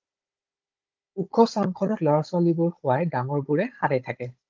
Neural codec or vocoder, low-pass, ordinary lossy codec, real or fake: codec, 16 kHz, 16 kbps, FunCodec, trained on Chinese and English, 50 frames a second; 7.2 kHz; Opus, 24 kbps; fake